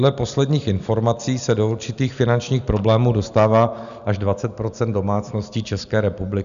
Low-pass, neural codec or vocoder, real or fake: 7.2 kHz; none; real